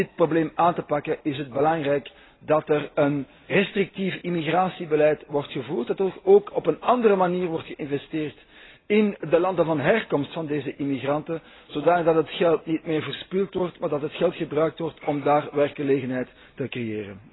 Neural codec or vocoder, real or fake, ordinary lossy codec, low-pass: none; real; AAC, 16 kbps; 7.2 kHz